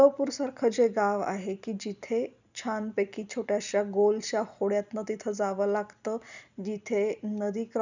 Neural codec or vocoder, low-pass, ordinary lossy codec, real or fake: none; 7.2 kHz; none; real